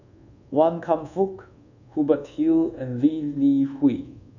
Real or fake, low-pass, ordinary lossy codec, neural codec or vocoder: fake; 7.2 kHz; none; codec, 24 kHz, 1.2 kbps, DualCodec